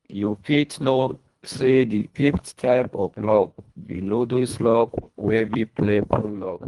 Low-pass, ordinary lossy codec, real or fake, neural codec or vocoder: 10.8 kHz; Opus, 32 kbps; fake; codec, 24 kHz, 1.5 kbps, HILCodec